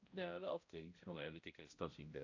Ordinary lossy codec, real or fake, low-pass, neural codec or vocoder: AAC, 48 kbps; fake; 7.2 kHz; codec, 16 kHz, 0.5 kbps, X-Codec, HuBERT features, trained on balanced general audio